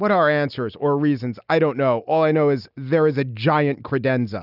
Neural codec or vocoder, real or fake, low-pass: none; real; 5.4 kHz